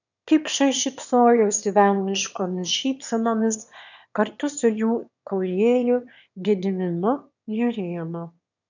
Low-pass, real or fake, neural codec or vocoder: 7.2 kHz; fake; autoencoder, 22.05 kHz, a latent of 192 numbers a frame, VITS, trained on one speaker